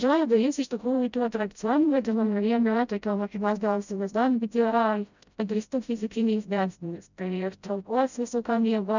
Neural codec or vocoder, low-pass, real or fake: codec, 16 kHz, 0.5 kbps, FreqCodec, smaller model; 7.2 kHz; fake